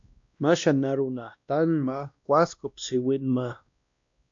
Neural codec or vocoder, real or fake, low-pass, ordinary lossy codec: codec, 16 kHz, 1 kbps, X-Codec, WavLM features, trained on Multilingual LibriSpeech; fake; 7.2 kHz; MP3, 64 kbps